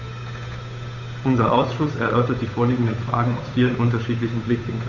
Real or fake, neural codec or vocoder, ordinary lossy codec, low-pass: fake; codec, 16 kHz, 8 kbps, FunCodec, trained on Chinese and English, 25 frames a second; none; 7.2 kHz